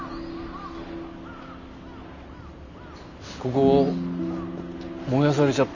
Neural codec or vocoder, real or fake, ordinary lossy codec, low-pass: none; real; none; 7.2 kHz